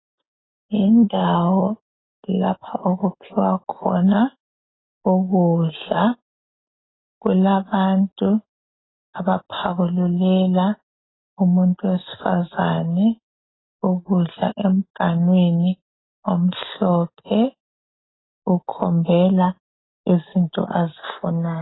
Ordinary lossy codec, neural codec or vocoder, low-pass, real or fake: AAC, 16 kbps; none; 7.2 kHz; real